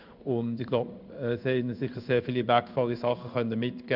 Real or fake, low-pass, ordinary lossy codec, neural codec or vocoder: fake; 5.4 kHz; none; codec, 16 kHz in and 24 kHz out, 1 kbps, XY-Tokenizer